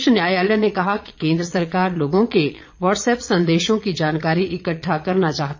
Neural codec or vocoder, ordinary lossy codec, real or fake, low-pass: vocoder, 22.05 kHz, 80 mel bands, Vocos; MP3, 48 kbps; fake; 7.2 kHz